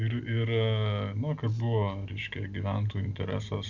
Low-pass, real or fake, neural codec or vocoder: 7.2 kHz; real; none